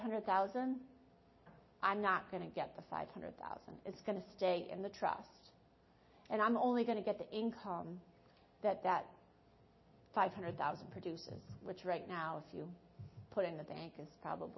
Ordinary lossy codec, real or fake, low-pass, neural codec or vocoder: MP3, 24 kbps; real; 7.2 kHz; none